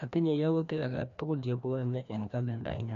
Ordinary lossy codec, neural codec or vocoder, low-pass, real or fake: none; codec, 16 kHz, 1 kbps, FreqCodec, larger model; 7.2 kHz; fake